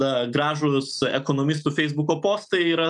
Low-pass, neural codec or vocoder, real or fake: 10.8 kHz; none; real